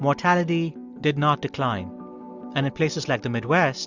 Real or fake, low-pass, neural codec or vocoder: real; 7.2 kHz; none